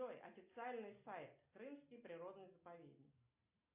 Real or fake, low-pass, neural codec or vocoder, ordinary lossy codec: real; 3.6 kHz; none; AAC, 32 kbps